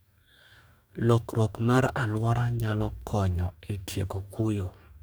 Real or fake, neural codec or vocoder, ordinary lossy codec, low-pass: fake; codec, 44.1 kHz, 2.6 kbps, DAC; none; none